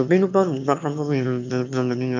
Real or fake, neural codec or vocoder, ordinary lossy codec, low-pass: fake; autoencoder, 22.05 kHz, a latent of 192 numbers a frame, VITS, trained on one speaker; MP3, 64 kbps; 7.2 kHz